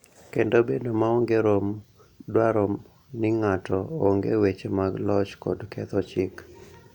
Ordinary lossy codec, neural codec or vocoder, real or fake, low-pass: none; none; real; 19.8 kHz